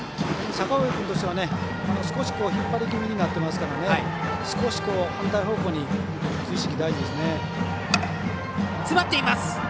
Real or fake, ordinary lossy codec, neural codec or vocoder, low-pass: real; none; none; none